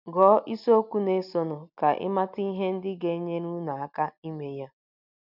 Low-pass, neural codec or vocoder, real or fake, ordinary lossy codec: 5.4 kHz; none; real; none